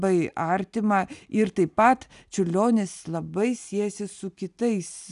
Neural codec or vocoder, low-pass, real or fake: none; 10.8 kHz; real